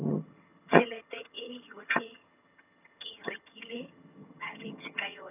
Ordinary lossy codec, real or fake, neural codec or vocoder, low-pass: none; fake; vocoder, 22.05 kHz, 80 mel bands, HiFi-GAN; 3.6 kHz